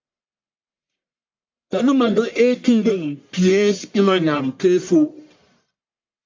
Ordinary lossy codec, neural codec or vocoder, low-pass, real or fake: MP3, 48 kbps; codec, 44.1 kHz, 1.7 kbps, Pupu-Codec; 7.2 kHz; fake